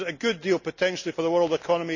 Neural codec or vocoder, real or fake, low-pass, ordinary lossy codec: none; real; 7.2 kHz; none